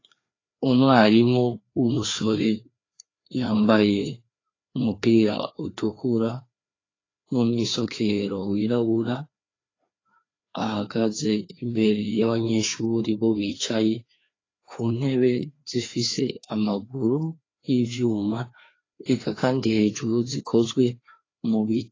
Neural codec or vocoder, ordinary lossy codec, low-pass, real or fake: codec, 16 kHz, 2 kbps, FreqCodec, larger model; AAC, 32 kbps; 7.2 kHz; fake